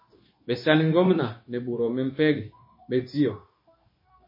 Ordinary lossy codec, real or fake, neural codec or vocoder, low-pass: MP3, 24 kbps; fake; codec, 16 kHz, 0.9 kbps, LongCat-Audio-Codec; 5.4 kHz